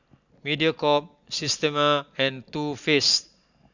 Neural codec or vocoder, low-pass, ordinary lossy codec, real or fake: none; 7.2 kHz; none; real